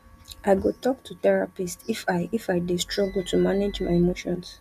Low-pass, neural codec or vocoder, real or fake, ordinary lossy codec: 14.4 kHz; none; real; none